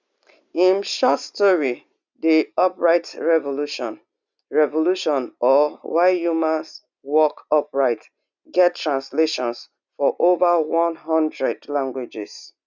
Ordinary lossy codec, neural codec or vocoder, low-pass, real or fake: none; none; 7.2 kHz; real